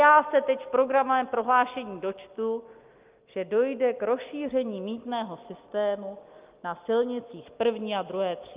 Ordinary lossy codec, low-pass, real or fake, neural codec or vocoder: Opus, 24 kbps; 3.6 kHz; real; none